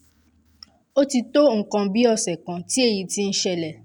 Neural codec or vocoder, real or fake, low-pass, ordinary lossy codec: none; real; 19.8 kHz; none